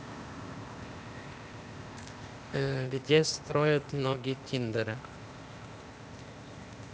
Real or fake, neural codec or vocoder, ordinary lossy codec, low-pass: fake; codec, 16 kHz, 0.8 kbps, ZipCodec; none; none